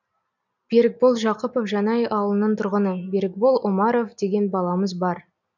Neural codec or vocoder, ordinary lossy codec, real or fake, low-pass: none; none; real; 7.2 kHz